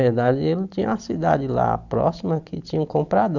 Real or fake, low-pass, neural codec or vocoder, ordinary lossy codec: real; 7.2 kHz; none; none